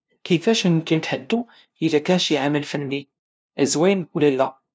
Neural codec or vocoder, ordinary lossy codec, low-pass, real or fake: codec, 16 kHz, 0.5 kbps, FunCodec, trained on LibriTTS, 25 frames a second; none; none; fake